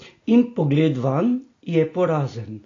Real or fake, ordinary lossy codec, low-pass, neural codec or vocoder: real; AAC, 32 kbps; 7.2 kHz; none